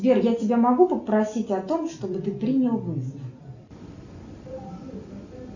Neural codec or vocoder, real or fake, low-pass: none; real; 7.2 kHz